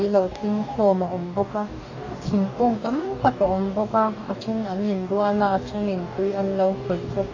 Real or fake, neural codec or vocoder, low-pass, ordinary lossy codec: fake; codec, 44.1 kHz, 2.6 kbps, DAC; 7.2 kHz; AAC, 48 kbps